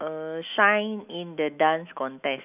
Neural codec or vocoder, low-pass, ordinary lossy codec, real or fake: autoencoder, 48 kHz, 128 numbers a frame, DAC-VAE, trained on Japanese speech; 3.6 kHz; none; fake